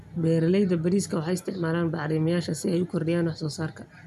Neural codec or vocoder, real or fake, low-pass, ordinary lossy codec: none; real; 14.4 kHz; none